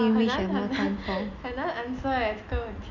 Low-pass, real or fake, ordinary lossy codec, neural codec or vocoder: 7.2 kHz; real; none; none